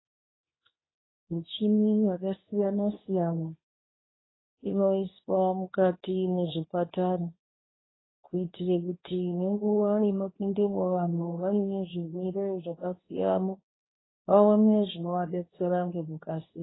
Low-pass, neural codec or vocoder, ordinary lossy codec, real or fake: 7.2 kHz; codec, 24 kHz, 0.9 kbps, WavTokenizer, medium speech release version 2; AAC, 16 kbps; fake